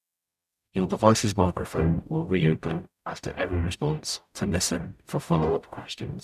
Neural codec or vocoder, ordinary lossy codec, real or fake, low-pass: codec, 44.1 kHz, 0.9 kbps, DAC; none; fake; 14.4 kHz